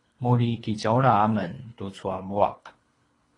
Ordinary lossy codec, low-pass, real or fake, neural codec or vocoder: AAC, 32 kbps; 10.8 kHz; fake; codec, 24 kHz, 3 kbps, HILCodec